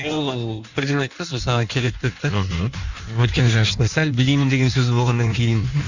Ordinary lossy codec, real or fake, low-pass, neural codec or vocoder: none; fake; 7.2 kHz; codec, 16 kHz in and 24 kHz out, 1.1 kbps, FireRedTTS-2 codec